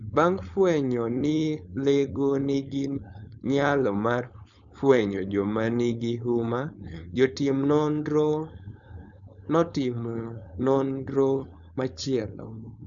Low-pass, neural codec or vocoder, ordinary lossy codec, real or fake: 7.2 kHz; codec, 16 kHz, 4.8 kbps, FACodec; none; fake